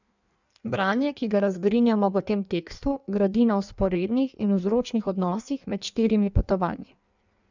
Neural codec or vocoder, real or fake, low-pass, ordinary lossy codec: codec, 16 kHz in and 24 kHz out, 1.1 kbps, FireRedTTS-2 codec; fake; 7.2 kHz; none